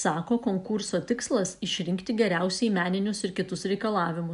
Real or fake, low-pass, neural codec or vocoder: real; 10.8 kHz; none